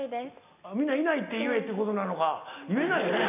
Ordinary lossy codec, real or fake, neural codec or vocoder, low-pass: AAC, 32 kbps; real; none; 3.6 kHz